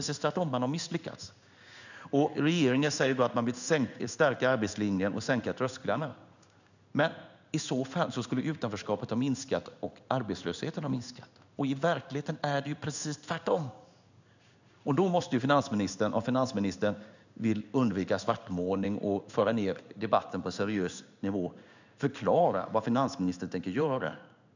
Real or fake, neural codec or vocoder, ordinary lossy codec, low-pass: fake; codec, 16 kHz in and 24 kHz out, 1 kbps, XY-Tokenizer; none; 7.2 kHz